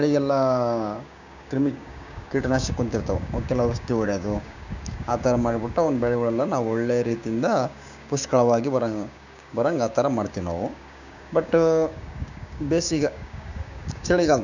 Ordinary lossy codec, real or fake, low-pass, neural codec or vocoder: none; fake; 7.2 kHz; codec, 16 kHz, 6 kbps, DAC